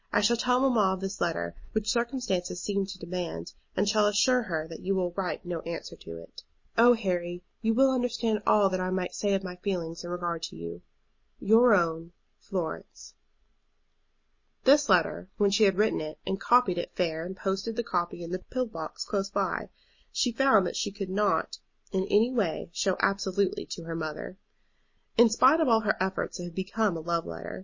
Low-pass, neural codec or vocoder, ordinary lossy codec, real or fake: 7.2 kHz; none; MP3, 32 kbps; real